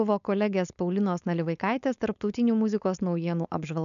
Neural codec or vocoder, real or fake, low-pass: codec, 16 kHz, 4.8 kbps, FACodec; fake; 7.2 kHz